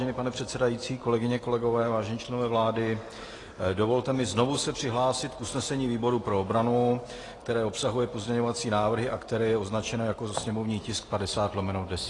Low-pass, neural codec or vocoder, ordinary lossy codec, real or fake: 10.8 kHz; none; AAC, 32 kbps; real